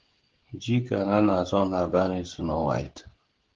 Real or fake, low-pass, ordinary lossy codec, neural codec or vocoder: fake; 7.2 kHz; Opus, 16 kbps; codec, 16 kHz, 16 kbps, FreqCodec, smaller model